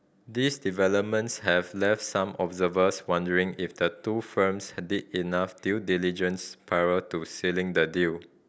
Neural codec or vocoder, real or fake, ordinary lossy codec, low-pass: none; real; none; none